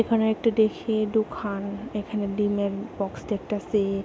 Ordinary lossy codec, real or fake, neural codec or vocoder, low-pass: none; real; none; none